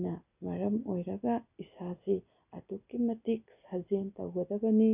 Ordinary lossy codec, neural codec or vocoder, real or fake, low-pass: none; none; real; 3.6 kHz